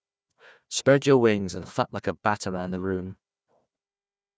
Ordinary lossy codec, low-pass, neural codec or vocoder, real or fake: none; none; codec, 16 kHz, 1 kbps, FunCodec, trained on Chinese and English, 50 frames a second; fake